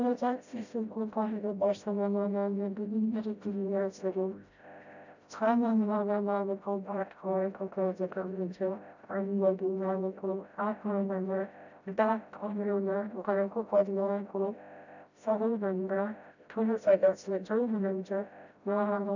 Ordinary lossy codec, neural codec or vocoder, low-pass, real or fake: none; codec, 16 kHz, 0.5 kbps, FreqCodec, smaller model; 7.2 kHz; fake